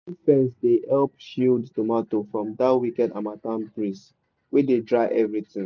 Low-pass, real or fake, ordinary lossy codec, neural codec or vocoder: 7.2 kHz; real; none; none